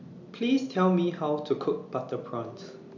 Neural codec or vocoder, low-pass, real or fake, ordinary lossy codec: none; 7.2 kHz; real; none